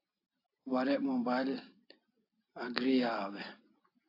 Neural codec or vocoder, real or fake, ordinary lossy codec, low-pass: none; real; AAC, 32 kbps; 5.4 kHz